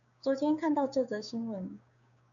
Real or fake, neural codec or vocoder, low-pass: fake; codec, 16 kHz, 6 kbps, DAC; 7.2 kHz